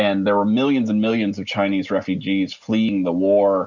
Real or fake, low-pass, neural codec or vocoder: real; 7.2 kHz; none